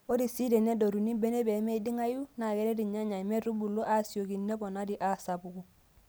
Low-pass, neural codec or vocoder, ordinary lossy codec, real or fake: none; none; none; real